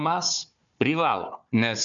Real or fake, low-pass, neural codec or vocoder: fake; 7.2 kHz; codec, 16 kHz, 4 kbps, FunCodec, trained on Chinese and English, 50 frames a second